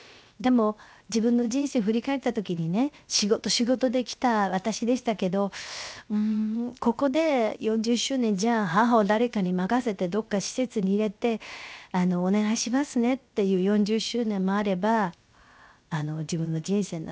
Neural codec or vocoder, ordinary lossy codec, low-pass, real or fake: codec, 16 kHz, 0.7 kbps, FocalCodec; none; none; fake